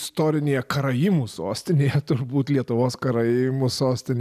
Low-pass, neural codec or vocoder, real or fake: 14.4 kHz; none; real